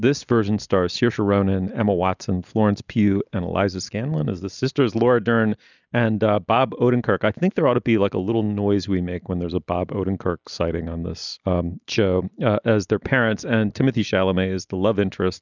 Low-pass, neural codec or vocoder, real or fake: 7.2 kHz; none; real